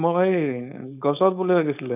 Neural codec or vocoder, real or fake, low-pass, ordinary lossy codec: codec, 16 kHz, 4.8 kbps, FACodec; fake; 3.6 kHz; none